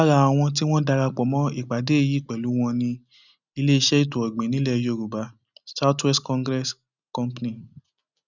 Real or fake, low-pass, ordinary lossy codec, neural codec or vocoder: real; 7.2 kHz; none; none